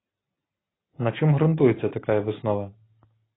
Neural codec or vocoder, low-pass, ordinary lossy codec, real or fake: none; 7.2 kHz; AAC, 16 kbps; real